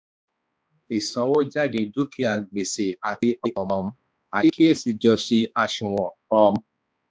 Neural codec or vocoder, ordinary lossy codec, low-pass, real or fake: codec, 16 kHz, 2 kbps, X-Codec, HuBERT features, trained on balanced general audio; none; none; fake